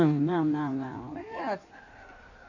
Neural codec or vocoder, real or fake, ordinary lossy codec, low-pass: codec, 16 kHz in and 24 kHz out, 1.1 kbps, FireRedTTS-2 codec; fake; none; 7.2 kHz